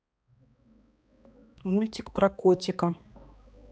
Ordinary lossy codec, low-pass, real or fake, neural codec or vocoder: none; none; fake; codec, 16 kHz, 2 kbps, X-Codec, HuBERT features, trained on balanced general audio